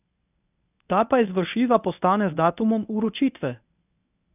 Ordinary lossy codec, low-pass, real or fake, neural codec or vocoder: none; 3.6 kHz; fake; codec, 24 kHz, 0.9 kbps, WavTokenizer, medium speech release version 1